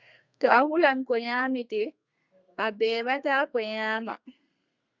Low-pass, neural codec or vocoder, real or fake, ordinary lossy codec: 7.2 kHz; codec, 32 kHz, 1.9 kbps, SNAC; fake; Opus, 64 kbps